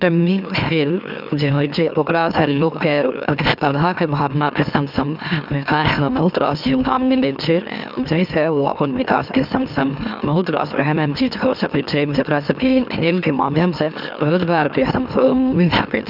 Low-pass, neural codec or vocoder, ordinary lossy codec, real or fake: 5.4 kHz; autoencoder, 44.1 kHz, a latent of 192 numbers a frame, MeloTTS; none; fake